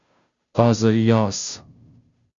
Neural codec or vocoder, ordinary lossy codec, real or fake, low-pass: codec, 16 kHz, 0.5 kbps, FunCodec, trained on Chinese and English, 25 frames a second; Opus, 64 kbps; fake; 7.2 kHz